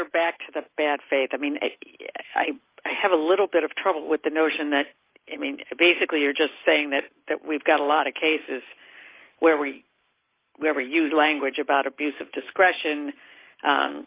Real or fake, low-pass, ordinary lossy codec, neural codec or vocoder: real; 3.6 kHz; Opus, 24 kbps; none